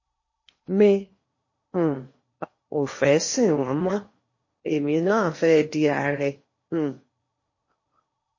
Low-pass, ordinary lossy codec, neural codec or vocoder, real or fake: 7.2 kHz; MP3, 32 kbps; codec, 16 kHz in and 24 kHz out, 0.8 kbps, FocalCodec, streaming, 65536 codes; fake